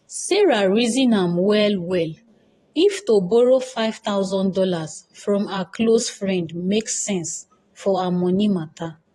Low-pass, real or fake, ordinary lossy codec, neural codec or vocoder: 19.8 kHz; fake; AAC, 32 kbps; vocoder, 44.1 kHz, 128 mel bands every 512 samples, BigVGAN v2